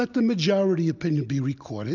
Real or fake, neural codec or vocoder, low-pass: real; none; 7.2 kHz